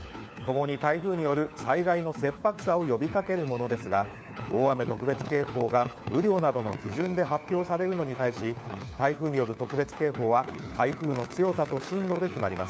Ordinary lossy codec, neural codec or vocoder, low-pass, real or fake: none; codec, 16 kHz, 4 kbps, FunCodec, trained on LibriTTS, 50 frames a second; none; fake